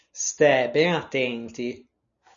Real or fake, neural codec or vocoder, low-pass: real; none; 7.2 kHz